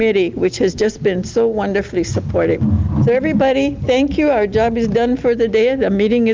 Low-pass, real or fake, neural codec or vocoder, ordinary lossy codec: 7.2 kHz; real; none; Opus, 32 kbps